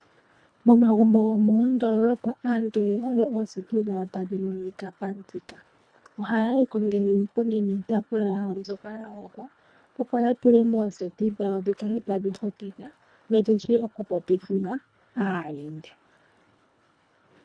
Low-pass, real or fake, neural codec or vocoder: 9.9 kHz; fake; codec, 24 kHz, 1.5 kbps, HILCodec